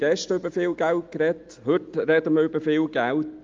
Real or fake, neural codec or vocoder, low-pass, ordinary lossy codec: real; none; 7.2 kHz; Opus, 32 kbps